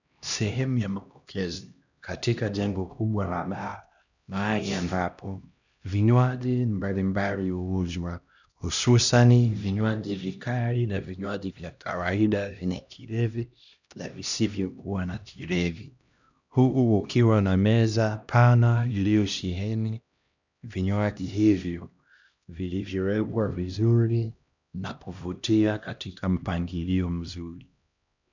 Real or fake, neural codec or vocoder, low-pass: fake; codec, 16 kHz, 1 kbps, X-Codec, HuBERT features, trained on LibriSpeech; 7.2 kHz